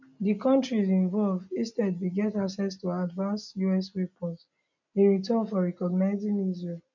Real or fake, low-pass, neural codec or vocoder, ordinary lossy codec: real; 7.2 kHz; none; none